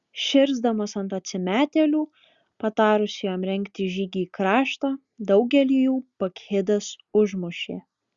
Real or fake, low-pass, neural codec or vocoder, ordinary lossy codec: real; 7.2 kHz; none; Opus, 64 kbps